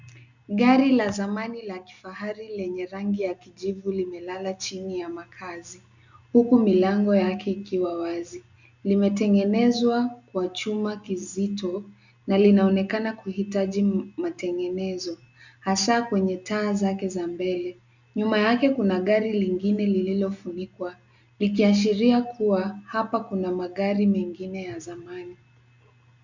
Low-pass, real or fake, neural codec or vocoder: 7.2 kHz; real; none